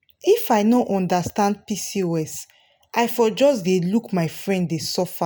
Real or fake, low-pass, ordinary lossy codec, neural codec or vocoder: real; none; none; none